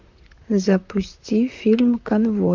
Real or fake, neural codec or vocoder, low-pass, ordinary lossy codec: fake; vocoder, 44.1 kHz, 128 mel bands, Pupu-Vocoder; 7.2 kHz; MP3, 64 kbps